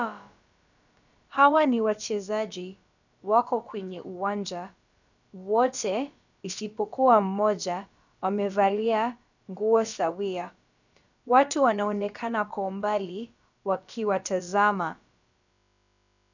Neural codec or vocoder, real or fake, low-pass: codec, 16 kHz, about 1 kbps, DyCAST, with the encoder's durations; fake; 7.2 kHz